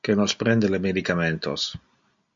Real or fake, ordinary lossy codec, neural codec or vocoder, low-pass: real; MP3, 48 kbps; none; 7.2 kHz